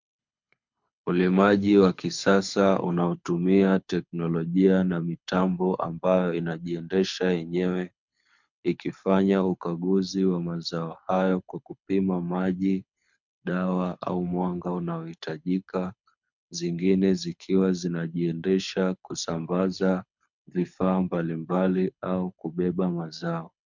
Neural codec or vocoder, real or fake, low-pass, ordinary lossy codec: codec, 24 kHz, 6 kbps, HILCodec; fake; 7.2 kHz; MP3, 64 kbps